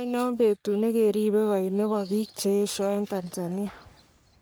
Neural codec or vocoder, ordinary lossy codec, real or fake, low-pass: codec, 44.1 kHz, 3.4 kbps, Pupu-Codec; none; fake; none